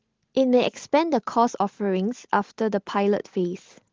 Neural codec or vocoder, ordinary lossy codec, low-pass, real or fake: none; Opus, 16 kbps; 7.2 kHz; real